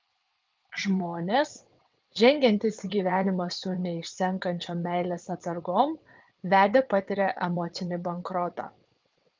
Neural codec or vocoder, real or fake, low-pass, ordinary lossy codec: vocoder, 22.05 kHz, 80 mel bands, WaveNeXt; fake; 7.2 kHz; Opus, 24 kbps